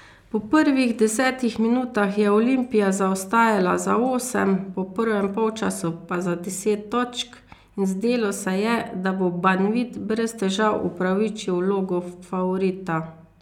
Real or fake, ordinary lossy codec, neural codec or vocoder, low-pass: real; none; none; 19.8 kHz